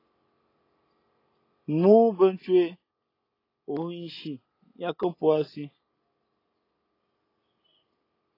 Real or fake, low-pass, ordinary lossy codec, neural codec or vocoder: real; 5.4 kHz; AAC, 24 kbps; none